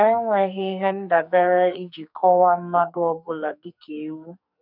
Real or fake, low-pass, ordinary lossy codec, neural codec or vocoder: fake; 5.4 kHz; none; codec, 44.1 kHz, 2.6 kbps, SNAC